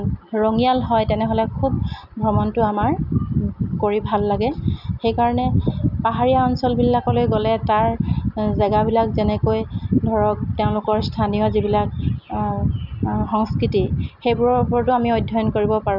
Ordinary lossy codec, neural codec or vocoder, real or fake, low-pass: none; none; real; 5.4 kHz